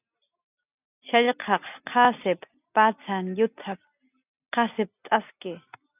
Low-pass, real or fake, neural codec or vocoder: 3.6 kHz; real; none